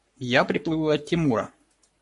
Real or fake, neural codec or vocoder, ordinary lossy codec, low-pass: fake; codec, 44.1 kHz, 7.8 kbps, Pupu-Codec; MP3, 48 kbps; 14.4 kHz